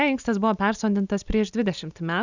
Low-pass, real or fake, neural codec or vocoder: 7.2 kHz; real; none